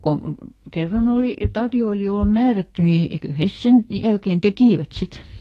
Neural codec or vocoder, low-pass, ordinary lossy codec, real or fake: codec, 44.1 kHz, 2.6 kbps, SNAC; 14.4 kHz; AAC, 48 kbps; fake